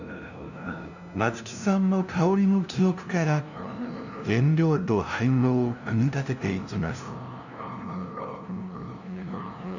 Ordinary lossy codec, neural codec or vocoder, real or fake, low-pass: none; codec, 16 kHz, 0.5 kbps, FunCodec, trained on LibriTTS, 25 frames a second; fake; 7.2 kHz